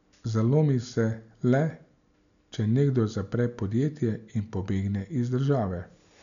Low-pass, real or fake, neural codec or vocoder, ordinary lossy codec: 7.2 kHz; real; none; none